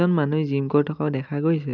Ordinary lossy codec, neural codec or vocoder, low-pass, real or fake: none; none; 7.2 kHz; real